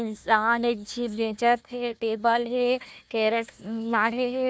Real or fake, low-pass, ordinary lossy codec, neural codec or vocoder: fake; none; none; codec, 16 kHz, 1 kbps, FunCodec, trained on Chinese and English, 50 frames a second